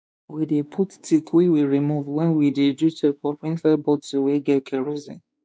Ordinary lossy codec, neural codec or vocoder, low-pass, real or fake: none; codec, 16 kHz, 2 kbps, X-Codec, WavLM features, trained on Multilingual LibriSpeech; none; fake